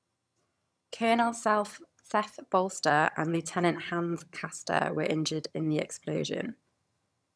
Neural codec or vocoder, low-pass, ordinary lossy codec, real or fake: vocoder, 22.05 kHz, 80 mel bands, HiFi-GAN; none; none; fake